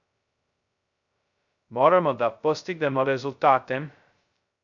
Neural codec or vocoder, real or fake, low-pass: codec, 16 kHz, 0.2 kbps, FocalCodec; fake; 7.2 kHz